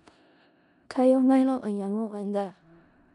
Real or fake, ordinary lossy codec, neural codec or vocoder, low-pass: fake; none; codec, 16 kHz in and 24 kHz out, 0.4 kbps, LongCat-Audio-Codec, four codebook decoder; 10.8 kHz